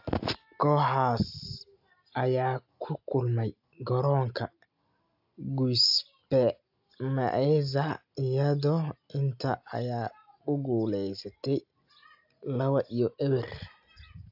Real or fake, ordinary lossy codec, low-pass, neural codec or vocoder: real; AAC, 48 kbps; 5.4 kHz; none